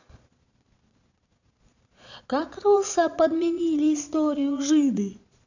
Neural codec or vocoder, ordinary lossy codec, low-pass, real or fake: vocoder, 22.05 kHz, 80 mel bands, Vocos; none; 7.2 kHz; fake